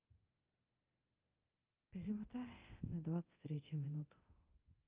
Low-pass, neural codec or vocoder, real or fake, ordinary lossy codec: 3.6 kHz; codec, 24 kHz, 0.9 kbps, DualCodec; fake; Opus, 32 kbps